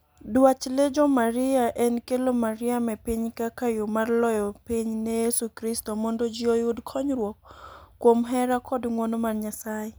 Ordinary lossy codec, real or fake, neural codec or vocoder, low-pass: none; real; none; none